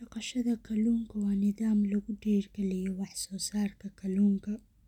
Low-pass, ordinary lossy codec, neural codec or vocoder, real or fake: 19.8 kHz; none; none; real